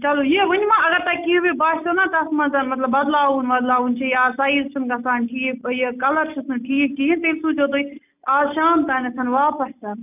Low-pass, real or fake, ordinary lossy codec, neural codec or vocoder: 3.6 kHz; real; none; none